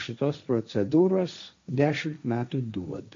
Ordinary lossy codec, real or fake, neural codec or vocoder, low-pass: AAC, 48 kbps; fake; codec, 16 kHz, 1.1 kbps, Voila-Tokenizer; 7.2 kHz